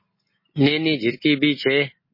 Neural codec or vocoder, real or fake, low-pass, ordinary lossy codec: none; real; 5.4 kHz; MP3, 24 kbps